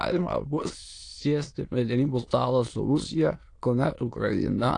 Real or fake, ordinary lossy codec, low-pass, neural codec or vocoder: fake; AAC, 48 kbps; 9.9 kHz; autoencoder, 22.05 kHz, a latent of 192 numbers a frame, VITS, trained on many speakers